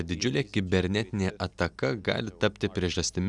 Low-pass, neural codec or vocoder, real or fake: 10.8 kHz; none; real